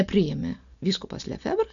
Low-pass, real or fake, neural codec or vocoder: 7.2 kHz; real; none